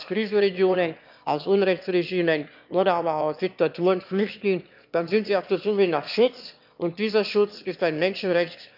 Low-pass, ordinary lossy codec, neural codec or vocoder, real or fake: 5.4 kHz; none; autoencoder, 22.05 kHz, a latent of 192 numbers a frame, VITS, trained on one speaker; fake